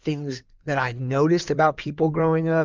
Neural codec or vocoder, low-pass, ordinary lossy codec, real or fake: codec, 16 kHz in and 24 kHz out, 2.2 kbps, FireRedTTS-2 codec; 7.2 kHz; Opus, 24 kbps; fake